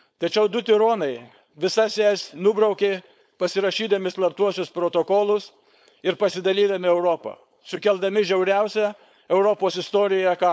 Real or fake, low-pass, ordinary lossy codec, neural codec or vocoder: fake; none; none; codec, 16 kHz, 4.8 kbps, FACodec